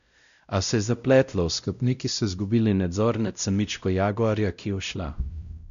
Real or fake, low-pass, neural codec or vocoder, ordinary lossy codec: fake; 7.2 kHz; codec, 16 kHz, 0.5 kbps, X-Codec, WavLM features, trained on Multilingual LibriSpeech; none